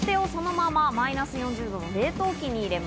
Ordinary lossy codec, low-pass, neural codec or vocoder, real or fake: none; none; none; real